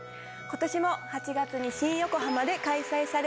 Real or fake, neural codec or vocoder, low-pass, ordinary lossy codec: real; none; none; none